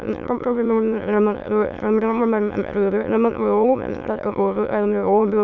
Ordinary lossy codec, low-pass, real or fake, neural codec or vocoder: none; 7.2 kHz; fake; autoencoder, 22.05 kHz, a latent of 192 numbers a frame, VITS, trained on many speakers